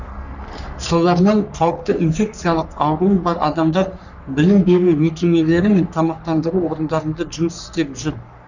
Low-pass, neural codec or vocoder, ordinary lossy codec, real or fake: 7.2 kHz; codec, 44.1 kHz, 3.4 kbps, Pupu-Codec; none; fake